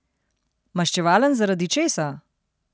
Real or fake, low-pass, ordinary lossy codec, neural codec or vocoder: real; none; none; none